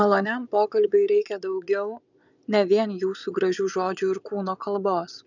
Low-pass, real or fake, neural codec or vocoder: 7.2 kHz; fake; vocoder, 22.05 kHz, 80 mel bands, Vocos